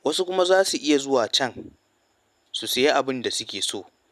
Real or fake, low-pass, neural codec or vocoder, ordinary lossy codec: real; 14.4 kHz; none; none